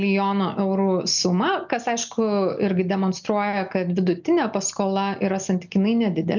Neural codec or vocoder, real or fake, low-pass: none; real; 7.2 kHz